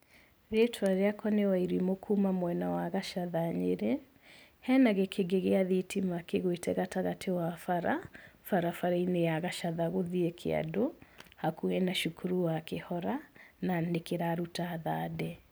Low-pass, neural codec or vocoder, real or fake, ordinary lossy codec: none; none; real; none